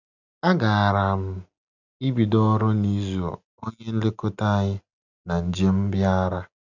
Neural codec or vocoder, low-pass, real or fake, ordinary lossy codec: none; 7.2 kHz; real; none